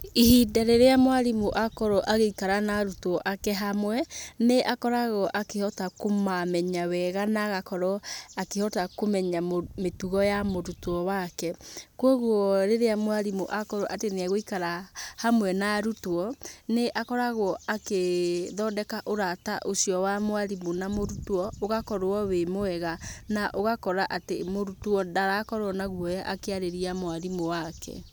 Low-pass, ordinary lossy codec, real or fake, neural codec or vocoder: none; none; real; none